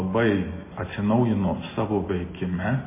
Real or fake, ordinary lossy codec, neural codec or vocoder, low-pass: real; MP3, 16 kbps; none; 3.6 kHz